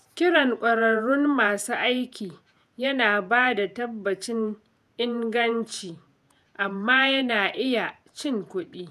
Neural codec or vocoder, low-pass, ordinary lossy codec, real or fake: vocoder, 48 kHz, 128 mel bands, Vocos; 14.4 kHz; none; fake